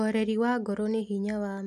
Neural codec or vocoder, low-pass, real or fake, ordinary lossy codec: none; 14.4 kHz; real; none